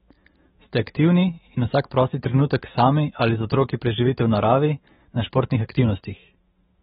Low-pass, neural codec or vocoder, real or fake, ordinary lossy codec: 19.8 kHz; autoencoder, 48 kHz, 128 numbers a frame, DAC-VAE, trained on Japanese speech; fake; AAC, 16 kbps